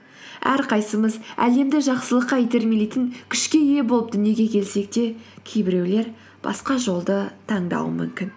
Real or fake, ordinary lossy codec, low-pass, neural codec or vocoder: real; none; none; none